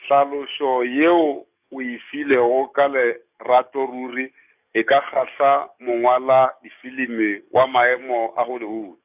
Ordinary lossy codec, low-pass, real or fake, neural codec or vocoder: none; 3.6 kHz; fake; codec, 44.1 kHz, 7.8 kbps, DAC